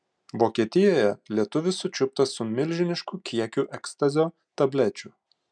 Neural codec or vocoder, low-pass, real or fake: none; 9.9 kHz; real